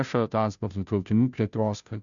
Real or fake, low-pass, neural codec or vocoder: fake; 7.2 kHz; codec, 16 kHz, 0.5 kbps, FunCodec, trained on Chinese and English, 25 frames a second